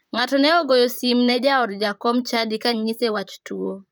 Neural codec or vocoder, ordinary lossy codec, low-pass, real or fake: vocoder, 44.1 kHz, 128 mel bands, Pupu-Vocoder; none; none; fake